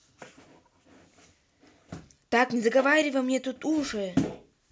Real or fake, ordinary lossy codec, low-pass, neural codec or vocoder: real; none; none; none